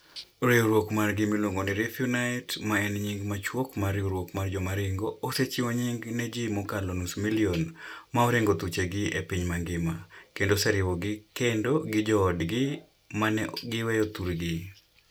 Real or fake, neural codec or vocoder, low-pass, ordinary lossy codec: real; none; none; none